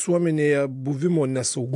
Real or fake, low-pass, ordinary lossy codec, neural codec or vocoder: real; 10.8 kHz; AAC, 64 kbps; none